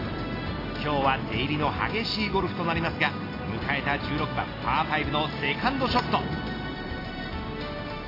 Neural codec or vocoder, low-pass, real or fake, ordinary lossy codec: none; 5.4 kHz; real; AAC, 24 kbps